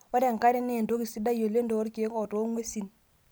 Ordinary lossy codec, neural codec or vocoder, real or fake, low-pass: none; none; real; none